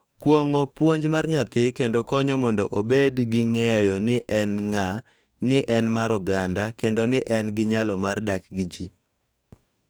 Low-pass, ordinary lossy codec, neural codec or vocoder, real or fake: none; none; codec, 44.1 kHz, 2.6 kbps, DAC; fake